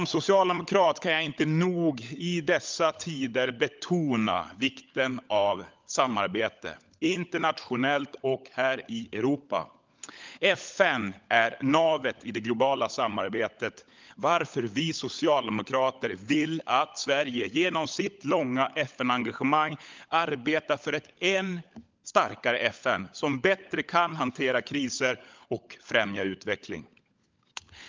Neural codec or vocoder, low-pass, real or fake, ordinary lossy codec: codec, 16 kHz, 16 kbps, FunCodec, trained on LibriTTS, 50 frames a second; 7.2 kHz; fake; Opus, 24 kbps